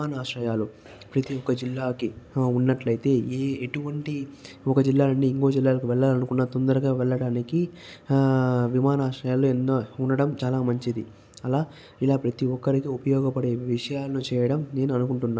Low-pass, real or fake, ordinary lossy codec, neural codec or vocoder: none; real; none; none